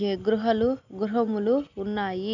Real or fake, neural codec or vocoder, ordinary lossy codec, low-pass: real; none; none; 7.2 kHz